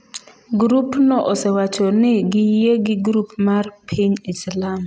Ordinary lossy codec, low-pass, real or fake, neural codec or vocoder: none; none; real; none